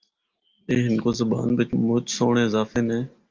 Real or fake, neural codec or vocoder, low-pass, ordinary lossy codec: real; none; 7.2 kHz; Opus, 24 kbps